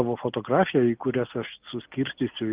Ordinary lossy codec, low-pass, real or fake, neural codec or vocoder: Opus, 24 kbps; 3.6 kHz; real; none